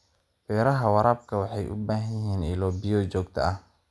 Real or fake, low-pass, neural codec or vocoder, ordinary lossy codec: real; none; none; none